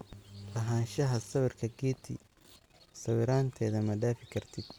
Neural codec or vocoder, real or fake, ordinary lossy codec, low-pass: none; real; none; 19.8 kHz